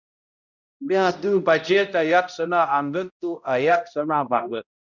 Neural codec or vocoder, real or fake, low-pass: codec, 16 kHz, 0.5 kbps, X-Codec, HuBERT features, trained on balanced general audio; fake; 7.2 kHz